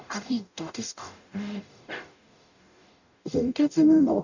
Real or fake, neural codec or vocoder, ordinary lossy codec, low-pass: fake; codec, 44.1 kHz, 0.9 kbps, DAC; none; 7.2 kHz